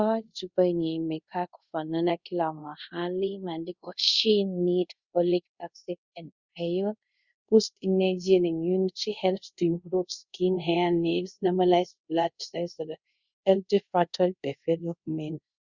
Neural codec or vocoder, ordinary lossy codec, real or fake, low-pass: codec, 24 kHz, 0.5 kbps, DualCodec; Opus, 64 kbps; fake; 7.2 kHz